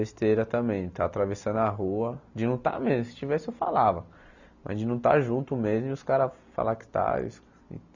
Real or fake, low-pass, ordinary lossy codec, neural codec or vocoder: real; 7.2 kHz; none; none